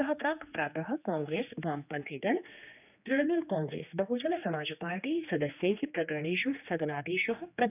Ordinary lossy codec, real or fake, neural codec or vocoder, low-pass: none; fake; codec, 16 kHz, 2 kbps, X-Codec, HuBERT features, trained on general audio; 3.6 kHz